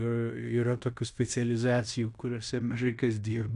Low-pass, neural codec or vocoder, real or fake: 10.8 kHz; codec, 16 kHz in and 24 kHz out, 0.9 kbps, LongCat-Audio-Codec, fine tuned four codebook decoder; fake